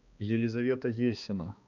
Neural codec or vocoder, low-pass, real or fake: codec, 16 kHz, 2 kbps, X-Codec, HuBERT features, trained on balanced general audio; 7.2 kHz; fake